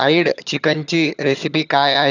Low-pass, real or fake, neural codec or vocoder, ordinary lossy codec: 7.2 kHz; fake; vocoder, 22.05 kHz, 80 mel bands, HiFi-GAN; none